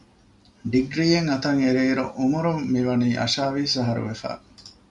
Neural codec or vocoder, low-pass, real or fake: none; 10.8 kHz; real